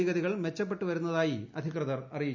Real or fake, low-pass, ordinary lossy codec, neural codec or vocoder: real; 7.2 kHz; none; none